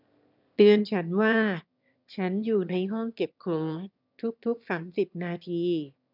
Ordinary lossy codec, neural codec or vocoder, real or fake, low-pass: none; autoencoder, 22.05 kHz, a latent of 192 numbers a frame, VITS, trained on one speaker; fake; 5.4 kHz